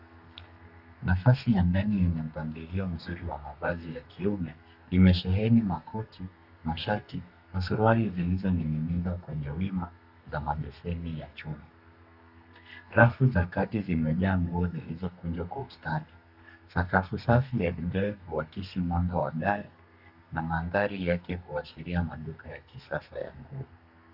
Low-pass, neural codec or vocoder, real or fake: 5.4 kHz; codec, 32 kHz, 1.9 kbps, SNAC; fake